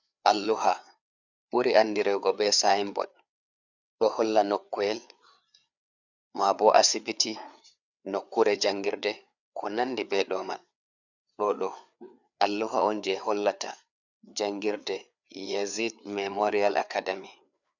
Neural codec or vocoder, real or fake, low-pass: codec, 16 kHz, 4 kbps, FreqCodec, larger model; fake; 7.2 kHz